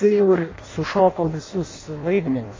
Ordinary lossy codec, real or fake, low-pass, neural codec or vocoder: MP3, 32 kbps; fake; 7.2 kHz; codec, 16 kHz in and 24 kHz out, 0.6 kbps, FireRedTTS-2 codec